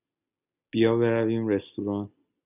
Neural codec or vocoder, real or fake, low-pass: none; real; 3.6 kHz